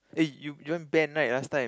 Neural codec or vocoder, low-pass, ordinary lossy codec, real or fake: none; none; none; real